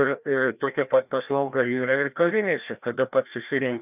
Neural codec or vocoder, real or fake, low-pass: codec, 16 kHz, 1 kbps, FreqCodec, larger model; fake; 3.6 kHz